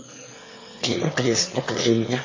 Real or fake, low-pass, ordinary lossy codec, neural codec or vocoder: fake; 7.2 kHz; MP3, 32 kbps; autoencoder, 22.05 kHz, a latent of 192 numbers a frame, VITS, trained on one speaker